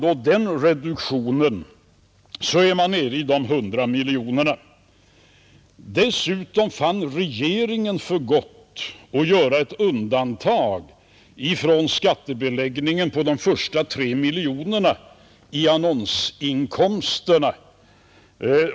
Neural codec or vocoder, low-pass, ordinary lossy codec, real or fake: none; none; none; real